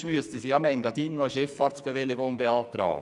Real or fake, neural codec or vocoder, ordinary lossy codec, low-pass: fake; codec, 44.1 kHz, 2.6 kbps, SNAC; none; 10.8 kHz